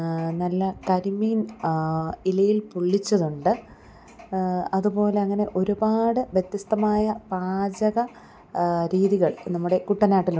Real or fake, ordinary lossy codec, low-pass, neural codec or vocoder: real; none; none; none